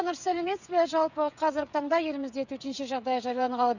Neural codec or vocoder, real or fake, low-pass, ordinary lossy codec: codec, 16 kHz, 8 kbps, FreqCodec, smaller model; fake; 7.2 kHz; none